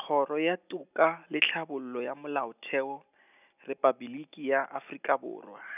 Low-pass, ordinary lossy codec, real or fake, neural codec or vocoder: 3.6 kHz; none; real; none